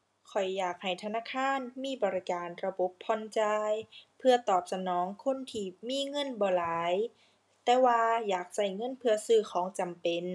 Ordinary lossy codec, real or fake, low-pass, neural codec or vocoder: none; real; none; none